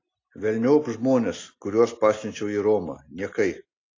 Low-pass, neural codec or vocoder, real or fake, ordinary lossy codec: 7.2 kHz; none; real; AAC, 32 kbps